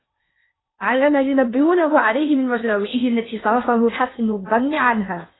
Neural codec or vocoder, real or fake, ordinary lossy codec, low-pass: codec, 16 kHz in and 24 kHz out, 0.8 kbps, FocalCodec, streaming, 65536 codes; fake; AAC, 16 kbps; 7.2 kHz